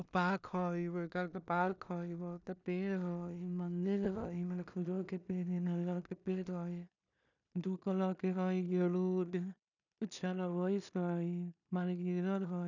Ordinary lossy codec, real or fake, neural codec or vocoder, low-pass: none; fake; codec, 16 kHz in and 24 kHz out, 0.4 kbps, LongCat-Audio-Codec, two codebook decoder; 7.2 kHz